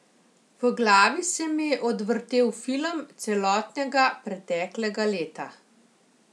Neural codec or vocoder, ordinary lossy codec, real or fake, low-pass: none; none; real; none